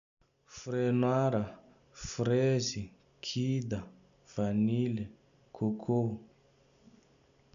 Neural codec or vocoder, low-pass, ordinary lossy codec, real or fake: none; 7.2 kHz; none; real